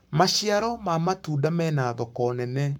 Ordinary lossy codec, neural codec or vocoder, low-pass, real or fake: none; codec, 44.1 kHz, 7.8 kbps, Pupu-Codec; 19.8 kHz; fake